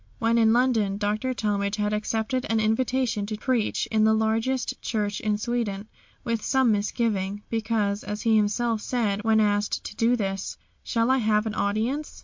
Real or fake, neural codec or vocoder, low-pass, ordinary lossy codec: real; none; 7.2 kHz; MP3, 48 kbps